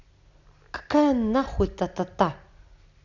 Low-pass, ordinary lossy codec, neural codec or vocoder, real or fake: 7.2 kHz; none; none; real